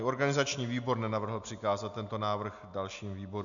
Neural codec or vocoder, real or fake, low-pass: none; real; 7.2 kHz